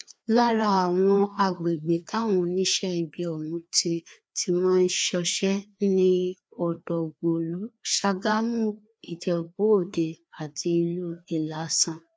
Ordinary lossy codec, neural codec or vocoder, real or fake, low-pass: none; codec, 16 kHz, 2 kbps, FreqCodec, larger model; fake; none